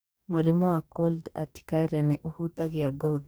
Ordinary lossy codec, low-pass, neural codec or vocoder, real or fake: none; none; codec, 44.1 kHz, 2.6 kbps, DAC; fake